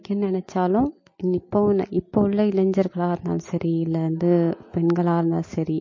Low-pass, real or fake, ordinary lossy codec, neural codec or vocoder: 7.2 kHz; real; MP3, 32 kbps; none